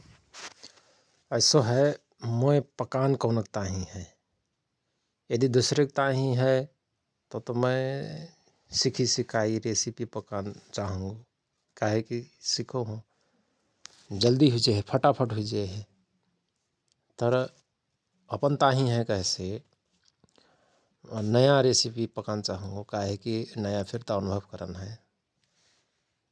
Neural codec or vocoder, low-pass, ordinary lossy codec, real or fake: none; none; none; real